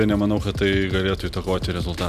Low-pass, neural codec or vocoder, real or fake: 14.4 kHz; none; real